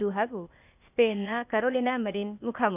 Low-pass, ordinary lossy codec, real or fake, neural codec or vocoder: 3.6 kHz; none; fake; codec, 16 kHz, 0.8 kbps, ZipCodec